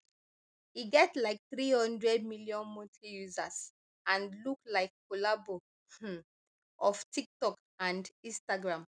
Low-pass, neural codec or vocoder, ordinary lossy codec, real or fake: 9.9 kHz; none; none; real